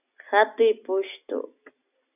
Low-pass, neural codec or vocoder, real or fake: 3.6 kHz; none; real